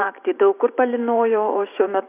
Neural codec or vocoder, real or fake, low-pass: vocoder, 44.1 kHz, 128 mel bands every 512 samples, BigVGAN v2; fake; 3.6 kHz